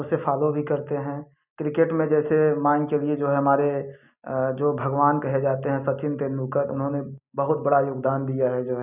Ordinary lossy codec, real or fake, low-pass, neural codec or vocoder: none; real; 3.6 kHz; none